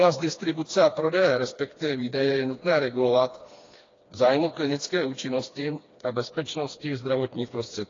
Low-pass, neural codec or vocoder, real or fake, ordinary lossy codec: 7.2 kHz; codec, 16 kHz, 2 kbps, FreqCodec, smaller model; fake; AAC, 32 kbps